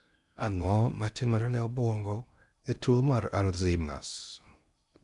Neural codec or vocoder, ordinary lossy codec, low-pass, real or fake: codec, 16 kHz in and 24 kHz out, 0.6 kbps, FocalCodec, streaming, 2048 codes; none; 10.8 kHz; fake